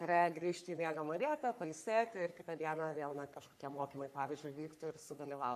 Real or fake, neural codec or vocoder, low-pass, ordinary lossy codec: fake; codec, 44.1 kHz, 3.4 kbps, Pupu-Codec; 14.4 kHz; AAC, 96 kbps